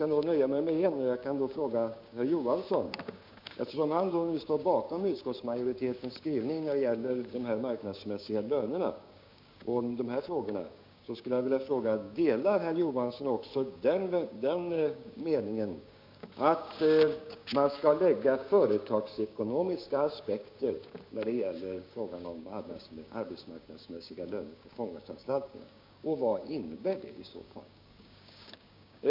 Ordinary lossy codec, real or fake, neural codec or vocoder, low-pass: MP3, 48 kbps; fake; codec, 16 kHz, 6 kbps, DAC; 5.4 kHz